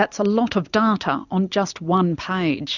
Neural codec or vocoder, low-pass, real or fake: none; 7.2 kHz; real